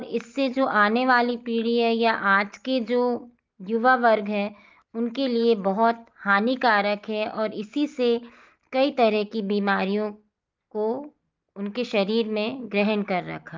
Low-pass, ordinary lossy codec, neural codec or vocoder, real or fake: 7.2 kHz; Opus, 32 kbps; none; real